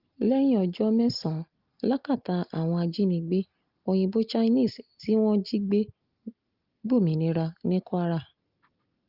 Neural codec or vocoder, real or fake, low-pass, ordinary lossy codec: none; real; 5.4 kHz; Opus, 32 kbps